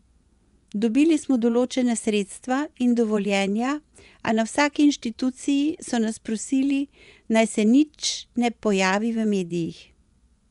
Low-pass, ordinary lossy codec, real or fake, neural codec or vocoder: 10.8 kHz; none; fake; vocoder, 24 kHz, 100 mel bands, Vocos